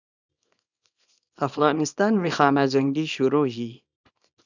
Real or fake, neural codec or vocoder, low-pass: fake; codec, 24 kHz, 0.9 kbps, WavTokenizer, small release; 7.2 kHz